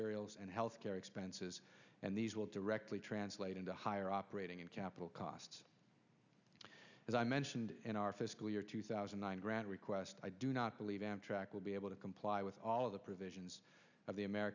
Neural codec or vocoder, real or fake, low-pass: none; real; 7.2 kHz